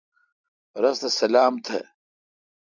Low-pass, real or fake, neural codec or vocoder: 7.2 kHz; real; none